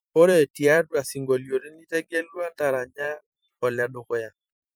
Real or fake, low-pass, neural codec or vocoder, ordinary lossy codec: fake; none; vocoder, 44.1 kHz, 128 mel bands every 512 samples, BigVGAN v2; none